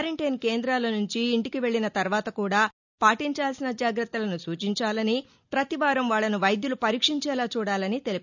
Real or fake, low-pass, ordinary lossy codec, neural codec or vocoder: real; 7.2 kHz; none; none